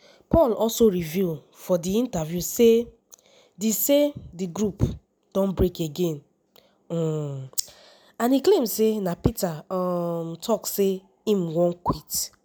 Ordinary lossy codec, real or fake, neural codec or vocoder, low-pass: none; real; none; none